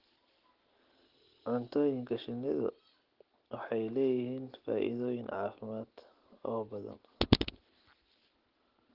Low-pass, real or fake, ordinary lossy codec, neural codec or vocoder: 5.4 kHz; real; Opus, 16 kbps; none